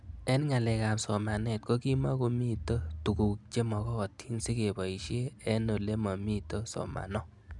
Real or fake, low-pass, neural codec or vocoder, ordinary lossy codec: real; 10.8 kHz; none; none